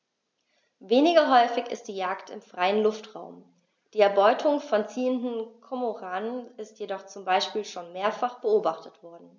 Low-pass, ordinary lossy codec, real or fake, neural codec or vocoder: none; none; real; none